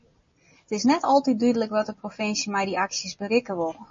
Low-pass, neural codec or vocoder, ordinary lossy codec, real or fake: 7.2 kHz; none; MP3, 32 kbps; real